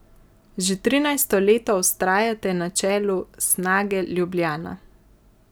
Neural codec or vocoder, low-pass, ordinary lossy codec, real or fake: none; none; none; real